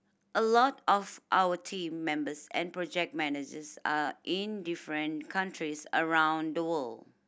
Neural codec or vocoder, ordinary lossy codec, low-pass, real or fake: none; none; none; real